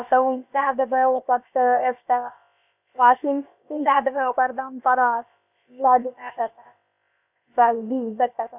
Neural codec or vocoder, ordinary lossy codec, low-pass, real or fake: codec, 16 kHz, about 1 kbps, DyCAST, with the encoder's durations; none; 3.6 kHz; fake